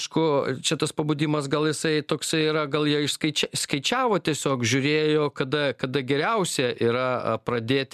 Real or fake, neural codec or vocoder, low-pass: real; none; 14.4 kHz